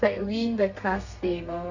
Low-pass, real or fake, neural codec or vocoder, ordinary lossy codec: 7.2 kHz; fake; codec, 32 kHz, 1.9 kbps, SNAC; none